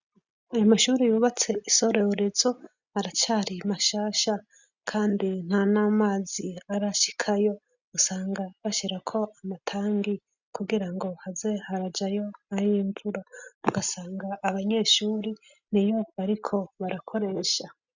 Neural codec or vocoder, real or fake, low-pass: none; real; 7.2 kHz